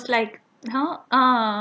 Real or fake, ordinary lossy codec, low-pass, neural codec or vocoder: real; none; none; none